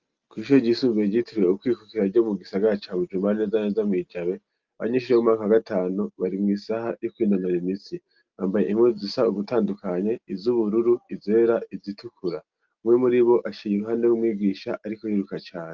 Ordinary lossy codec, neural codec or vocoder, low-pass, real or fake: Opus, 24 kbps; none; 7.2 kHz; real